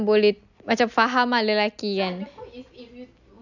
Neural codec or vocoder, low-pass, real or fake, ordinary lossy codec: none; 7.2 kHz; real; none